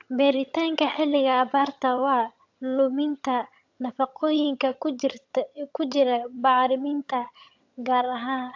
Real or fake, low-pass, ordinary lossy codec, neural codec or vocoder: fake; 7.2 kHz; AAC, 48 kbps; vocoder, 22.05 kHz, 80 mel bands, HiFi-GAN